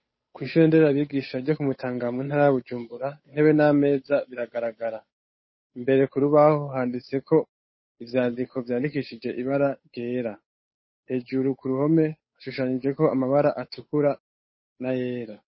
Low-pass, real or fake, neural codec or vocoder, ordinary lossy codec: 7.2 kHz; fake; codec, 16 kHz, 8 kbps, FunCodec, trained on Chinese and English, 25 frames a second; MP3, 24 kbps